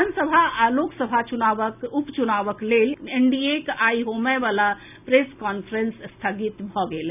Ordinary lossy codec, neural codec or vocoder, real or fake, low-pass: none; none; real; 3.6 kHz